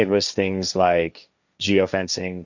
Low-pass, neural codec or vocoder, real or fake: 7.2 kHz; codec, 16 kHz, 1.1 kbps, Voila-Tokenizer; fake